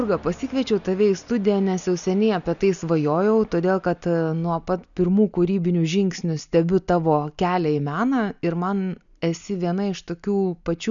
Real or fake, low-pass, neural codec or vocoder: real; 7.2 kHz; none